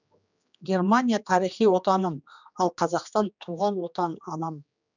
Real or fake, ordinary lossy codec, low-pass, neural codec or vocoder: fake; none; 7.2 kHz; codec, 16 kHz, 2 kbps, X-Codec, HuBERT features, trained on general audio